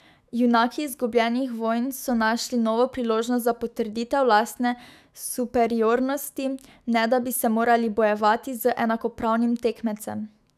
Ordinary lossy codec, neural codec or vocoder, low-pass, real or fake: none; autoencoder, 48 kHz, 128 numbers a frame, DAC-VAE, trained on Japanese speech; 14.4 kHz; fake